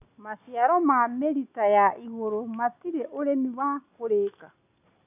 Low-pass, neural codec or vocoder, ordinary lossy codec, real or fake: 3.6 kHz; none; none; real